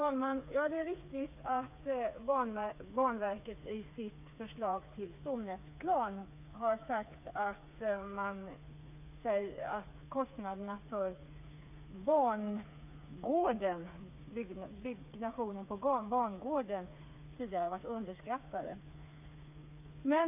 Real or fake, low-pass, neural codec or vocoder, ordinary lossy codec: fake; 3.6 kHz; codec, 16 kHz, 4 kbps, FreqCodec, smaller model; AAC, 32 kbps